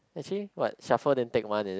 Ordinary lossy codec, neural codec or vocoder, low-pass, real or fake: none; none; none; real